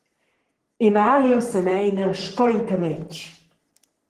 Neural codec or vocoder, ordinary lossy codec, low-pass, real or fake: codec, 44.1 kHz, 3.4 kbps, Pupu-Codec; Opus, 16 kbps; 14.4 kHz; fake